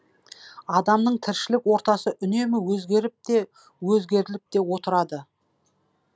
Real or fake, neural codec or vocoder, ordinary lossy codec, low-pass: real; none; none; none